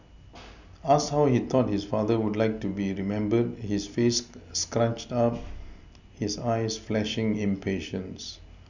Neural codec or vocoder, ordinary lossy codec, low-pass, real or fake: none; none; 7.2 kHz; real